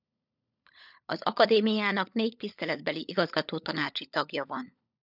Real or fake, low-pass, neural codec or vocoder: fake; 5.4 kHz; codec, 16 kHz, 16 kbps, FunCodec, trained on LibriTTS, 50 frames a second